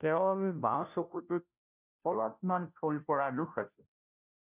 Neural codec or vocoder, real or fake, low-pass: codec, 16 kHz, 0.5 kbps, FunCodec, trained on Chinese and English, 25 frames a second; fake; 3.6 kHz